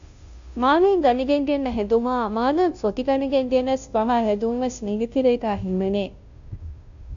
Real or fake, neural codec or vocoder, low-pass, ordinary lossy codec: fake; codec, 16 kHz, 0.5 kbps, FunCodec, trained on Chinese and English, 25 frames a second; 7.2 kHz; AAC, 64 kbps